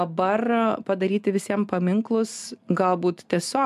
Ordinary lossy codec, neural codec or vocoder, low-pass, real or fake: MP3, 96 kbps; none; 14.4 kHz; real